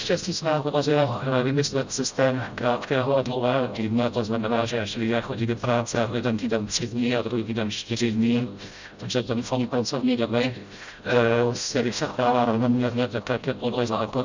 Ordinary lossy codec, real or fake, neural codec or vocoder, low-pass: Opus, 64 kbps; fake; codec, 16 kHz, 0.5 kbps, FreqCodec, smaller model; 7.2 kHz